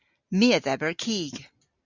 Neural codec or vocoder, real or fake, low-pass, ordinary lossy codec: none; real; 7.2 kHz; Opus, 64 kbps